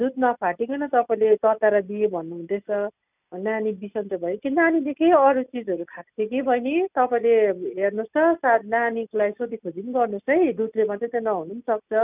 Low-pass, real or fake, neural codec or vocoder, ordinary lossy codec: 3.6 kHz; real; none; none